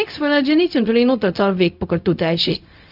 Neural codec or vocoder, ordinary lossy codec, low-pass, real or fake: codec, 16 kHz, 0.4 kbps, LongCat-Audio-Codec; none; 5.4 kHz; fake